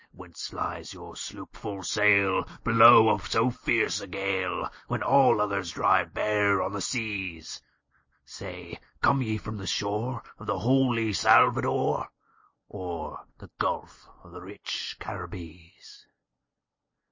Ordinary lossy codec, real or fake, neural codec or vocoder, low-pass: MP3, 32 kbps; real; none; 7.2 kHz